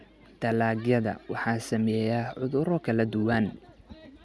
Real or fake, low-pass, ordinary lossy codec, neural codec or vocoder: real; none; none; none